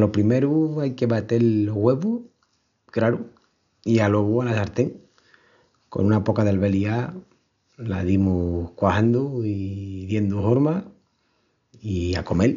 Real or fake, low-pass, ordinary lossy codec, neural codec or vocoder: real; 7.2 kHz; none; none